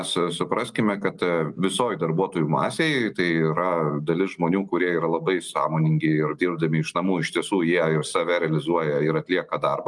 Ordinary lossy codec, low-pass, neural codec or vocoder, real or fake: Opus, 32 kbps; 10.8 kHz; none; real